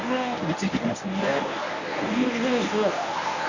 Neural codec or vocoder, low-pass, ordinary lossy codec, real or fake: codec, 24 kHz, 0.9 kbps, WavTokenizer, medium speech release version 1; 7.2 kHz; none; fake